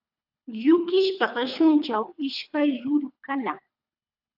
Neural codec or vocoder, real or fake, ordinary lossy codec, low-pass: codec, 24 kHz, 3 kbps, HILCodec; fake; AAC, 48 kbps; 5.4 kHz